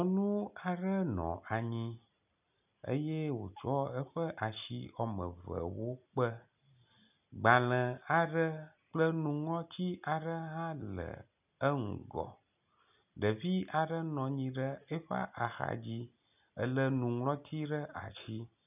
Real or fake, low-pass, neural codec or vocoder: real; 3.6 kHz; none